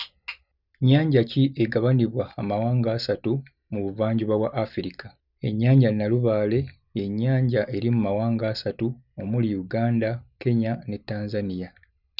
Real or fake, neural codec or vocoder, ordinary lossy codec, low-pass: real; none; none; 5.4 kHz